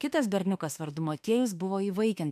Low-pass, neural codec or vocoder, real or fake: 14.4 kHz; autoencoder, 48 kHz, 32 numbers a frame, DAC-VAE, trained on Japanese speech; fake